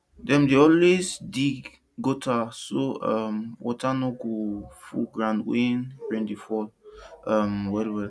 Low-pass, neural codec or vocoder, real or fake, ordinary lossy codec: none; none; real; none